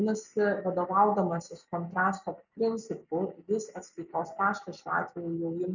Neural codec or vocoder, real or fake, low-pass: none; real; 7.2 kHz